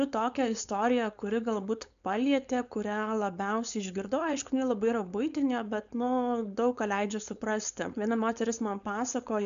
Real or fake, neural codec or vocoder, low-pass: fake; codec, 16 kHz, 4.8 kbps, FACodec; 7.2 kHz